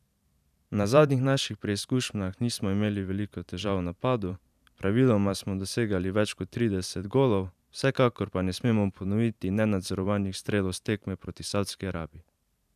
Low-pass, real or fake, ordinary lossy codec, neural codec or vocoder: 14.4 kHz; fake; none; vocoder, 44.1 kHz, 128 mel bands every 512 samples, BigVGAN v2